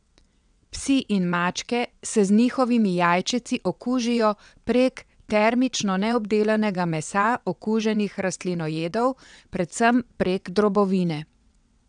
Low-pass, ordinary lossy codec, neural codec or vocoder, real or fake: 9.9 kHz; none; vocoder, 22.05 kHz, 80 mel bands, WaveNeXt; fake